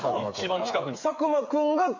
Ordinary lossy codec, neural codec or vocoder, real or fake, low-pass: MP3, 48 kbps; codec, 16 kHz, 8 kbps, FreqCodec, smaller model; fake; 7.2 kHz